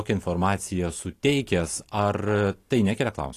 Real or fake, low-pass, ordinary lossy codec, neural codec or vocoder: real; 14.4 kHz; AAC, 48 kbps; none